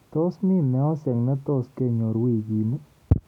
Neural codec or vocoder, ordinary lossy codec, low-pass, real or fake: none; none; 19.8 kHz; real